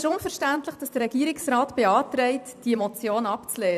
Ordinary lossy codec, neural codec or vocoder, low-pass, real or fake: none; vocoder, 44.1 kHz, 128 mel bands every 256 samples, BigVGAN v2; 14.4 kHz; fake